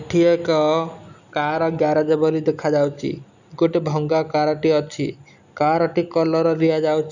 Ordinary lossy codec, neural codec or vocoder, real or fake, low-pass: none; none; real; 7.2 kHz